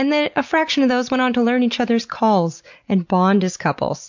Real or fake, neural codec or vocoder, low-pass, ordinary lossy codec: real; none; 7.2 kHz; MP3, 48 kbps